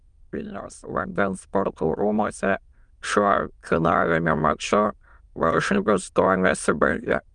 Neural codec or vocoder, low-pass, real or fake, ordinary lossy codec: autoencoder, 22.05 kHz, a latent of 192 numbers a frame, VITS, trained on many speakers; 9.9 kHz; fake; Opus, 32 kbps